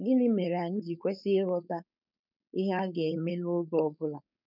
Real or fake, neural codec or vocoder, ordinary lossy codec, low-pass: fake; codec, 16 kHz, 4.8 kbps, FACodec; none; 5.4 kHz